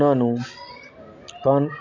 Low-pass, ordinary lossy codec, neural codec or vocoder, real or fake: 7.2 kHz; none; none; real